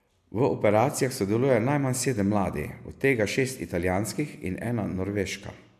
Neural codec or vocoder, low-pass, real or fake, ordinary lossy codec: none; 14.4 kHz; real; MP3, 96 kbps